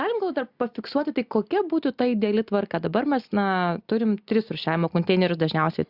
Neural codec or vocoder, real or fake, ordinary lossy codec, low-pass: none; real; Opus, 64 kbps; 5.4 kHz